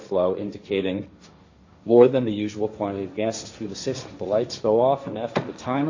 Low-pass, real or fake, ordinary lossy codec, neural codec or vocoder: 7.2 kHz; fake; AAC, 48 kbps; codec, 16 kHz, 1.1 kbps, Voila-Tokenizer